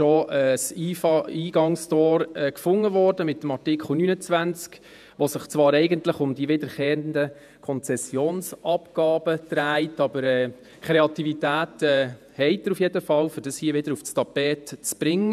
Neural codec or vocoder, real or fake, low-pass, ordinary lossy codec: vocoder, 48 kHz, 128 mel bands, Vocos; fake; 14.4 kHz; none